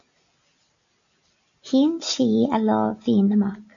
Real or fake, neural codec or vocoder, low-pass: real; none; 7.2 kHz